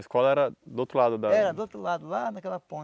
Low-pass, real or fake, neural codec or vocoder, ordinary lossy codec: none; real; none; none